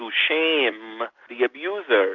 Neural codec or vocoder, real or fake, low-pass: none; real; 7.2 kHz